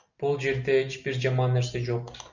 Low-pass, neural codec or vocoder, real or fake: 7.2 kHz; none; real